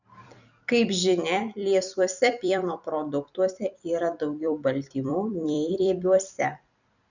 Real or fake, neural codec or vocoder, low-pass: fake; vocoder, 44.1 kHz, 128 mel bands every 256 samples, BigVGAN v2; 7.2 kHz